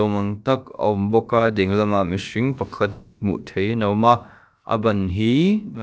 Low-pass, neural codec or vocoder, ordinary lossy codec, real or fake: none; codec, 16 kHz, about 1 kbps, DyCAST, with the encoder's durations; none; fake